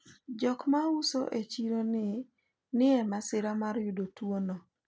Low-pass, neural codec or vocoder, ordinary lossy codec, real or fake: none; none; none; real